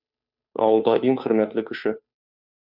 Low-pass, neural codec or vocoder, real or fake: 5.4 kHz; codec, 16 kHz, 2 kbps, FunCodec, trained on Chinese and English, 25 frames a second; fake